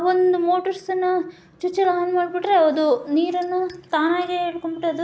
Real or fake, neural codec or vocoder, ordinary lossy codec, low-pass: real; none; none; none